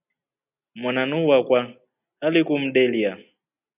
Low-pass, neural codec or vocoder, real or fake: 3.6 kHz; none; real